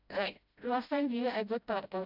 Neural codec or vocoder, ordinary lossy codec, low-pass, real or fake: codec, 16 kHz, 0.5 kbps, FreqCodec, smaller model; none; 5.4 kHz; fake